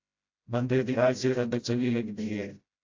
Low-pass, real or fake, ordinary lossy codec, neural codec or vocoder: 7.2 kHz; fake; AAC, 48 kbps; codec, 16 kHz, 0.5 kbps, FreqCodec, smaller model